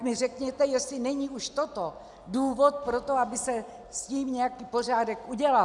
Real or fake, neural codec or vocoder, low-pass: real; none; 10.8 kHz